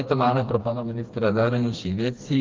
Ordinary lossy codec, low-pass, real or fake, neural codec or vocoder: Opus, 32 kbps; 7.2 kHz; fake; codec, 16 kHz, 2 kbps, FreqCodec, smaller model